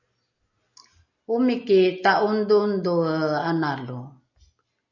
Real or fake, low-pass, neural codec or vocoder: real; 7.2 kHz; none